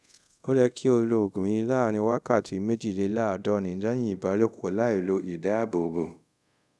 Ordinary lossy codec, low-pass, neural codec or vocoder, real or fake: none; none; codec, 24 kHz, 0.5 kbps, DualCodec; fake